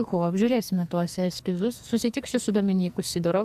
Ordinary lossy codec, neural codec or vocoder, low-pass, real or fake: AAC, 96 kbps; codec, 44.1 kHz, 2.6 kbps, SNAC; 14.4 kHz; fake